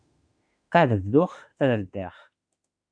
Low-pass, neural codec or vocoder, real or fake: 9.9 kHz; autoencoder, 48 kHz, 32 numbers a frame, DAC-VAE, trained on Japanese speech; fake